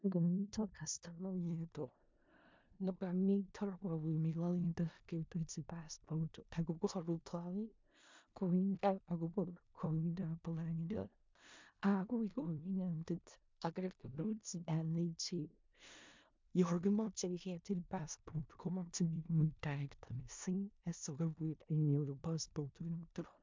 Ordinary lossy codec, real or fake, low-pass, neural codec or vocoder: MP3, 64 kbps; fake; 7.2 kHz; codec, 16 kHz in and 24 kHz out, 0.4 kbps, LongCat-Audio-Codec, four codebook decoder